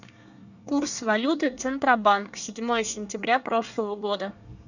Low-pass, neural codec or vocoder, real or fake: 7.2 kHz; codec, 24 kHz, 1 kbps, SNAC; fake